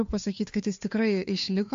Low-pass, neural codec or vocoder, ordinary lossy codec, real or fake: 7.2 kHz; codec, 16 kHz, 2 kbps, FunCodec, trained on Chinese and English, 25 frames a second; AAC, 64 kbps; fake